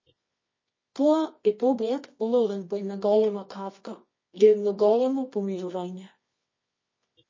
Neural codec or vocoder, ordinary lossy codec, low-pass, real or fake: codec, 24 kHz, 0.9 kbps, WavTokenizer, medium music audio release; MP3, 32 kbps; 7.2 kHz; fake